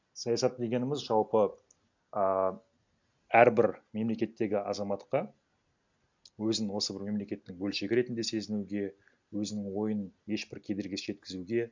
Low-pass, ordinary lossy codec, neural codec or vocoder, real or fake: 7.2 kHz; MP3, 64 kbps; none; real